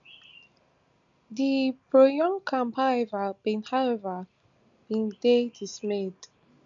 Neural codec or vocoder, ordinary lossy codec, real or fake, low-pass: none; none; real; 7.2 kHz